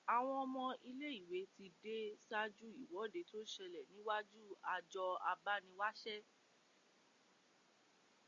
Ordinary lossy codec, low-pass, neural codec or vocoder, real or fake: Opus, 64 kbps; 7.2 kHz; none; real